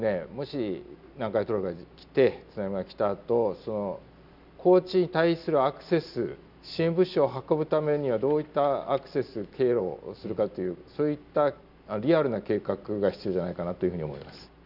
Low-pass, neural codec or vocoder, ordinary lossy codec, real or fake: 5.4 kHz; none; none; real